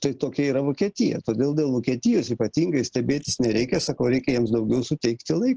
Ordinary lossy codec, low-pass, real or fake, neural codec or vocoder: Opus, 16 kbps; 7.2 kHz; real; none